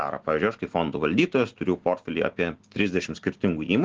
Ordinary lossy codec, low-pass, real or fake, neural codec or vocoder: Opus, 16 kbps; 7.2 kHz; real; none